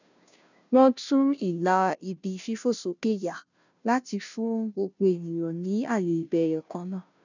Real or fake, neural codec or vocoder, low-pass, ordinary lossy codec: fake; codec, 16 kHz, 0.5 kbps, FunCodec, trained on Chinese and English, 25 frames a second; 7.2 kHz; none